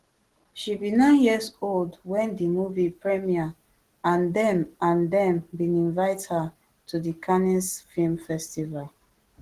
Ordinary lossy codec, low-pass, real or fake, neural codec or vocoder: Opus, 16 kbps; 14.4 kHz; real; none